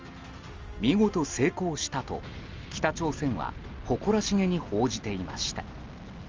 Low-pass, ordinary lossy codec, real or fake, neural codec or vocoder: 7.2 kHz; Opus, 32 kbps; real; none